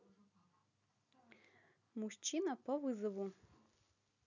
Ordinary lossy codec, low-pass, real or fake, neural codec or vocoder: none; 7.2 kHz; real; none